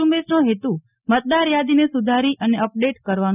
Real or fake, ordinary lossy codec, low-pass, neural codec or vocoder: real; none; 3.6 kHz; none